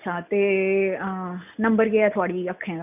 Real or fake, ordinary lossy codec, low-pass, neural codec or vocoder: real; none; 3.6 kHz; none